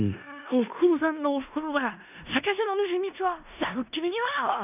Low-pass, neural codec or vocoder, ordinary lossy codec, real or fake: 3.6 kHz; codec, 16 kHz in and 24 kHz out, 0.4 kbps, LongCat-Audio-Codec, four codebook decoder; none; fake